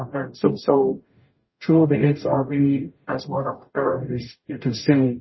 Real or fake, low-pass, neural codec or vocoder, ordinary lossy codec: fake; 7.2 kHz; codec, 44.1 kHz, 0.9 kbps, DAC; MP3, 24 kbps